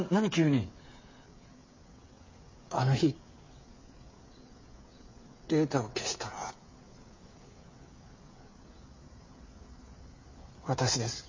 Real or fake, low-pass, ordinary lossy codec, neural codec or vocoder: fake; 7.2 kHz; MP3, 32 kbps; codec, 16 kHz, 8 kbps, FreqCodec, smaller model